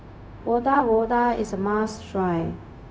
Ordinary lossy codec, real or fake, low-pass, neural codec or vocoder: none; fake; none; codec, 16 kHz, 0.4 kbps, LongCat-Audio-Codec